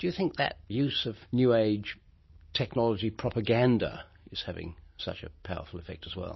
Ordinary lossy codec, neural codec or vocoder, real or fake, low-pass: MP3, 24 kbps; none; real; 7.2 kHz